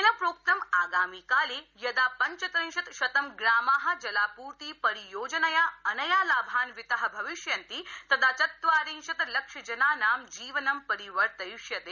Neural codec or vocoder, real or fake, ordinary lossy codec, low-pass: none; real; none; 7.2 kHz